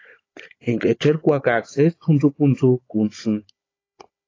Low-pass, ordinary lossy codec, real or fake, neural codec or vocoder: 7.2 kHz; AAC, 32 kbps; fake; codec, 16 kHz, 16 kbps, FunCodec, trained on Chinese and English, 50 frames a second